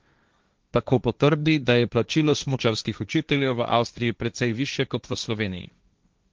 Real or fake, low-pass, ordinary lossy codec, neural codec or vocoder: fake; 7.2 kHz; Opus, 24 kbps; codec, 16 kHz, 1.1 kbps, Voila-Tokenizer